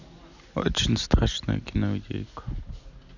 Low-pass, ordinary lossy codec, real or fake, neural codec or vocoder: 7.2 kHz; none; real; none